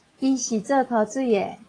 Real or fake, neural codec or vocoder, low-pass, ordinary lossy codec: fake; autoencoder, 48 kHz, 128 numbers a frame, DAC-VAE, trained on Japanese speech; 9.9 kHz; AAC, 32 kbps